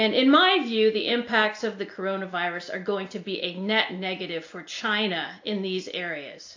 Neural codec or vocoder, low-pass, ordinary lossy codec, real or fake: none; 7.2 kHz; AAC, 48 kbps; real